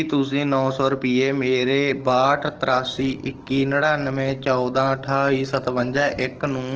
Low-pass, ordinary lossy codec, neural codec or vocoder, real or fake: 7.2 kHz; Opus, 16 kbps; codec, 44.1 kHz, 7.8 kbps, DAC; fake